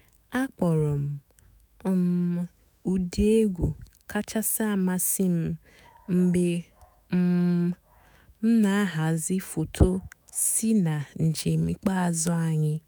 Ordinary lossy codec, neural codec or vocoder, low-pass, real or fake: none; autoencoder, 48 kHz, 128 numbers a frame, DAC-VAE, trained on Japanese speech; none; fake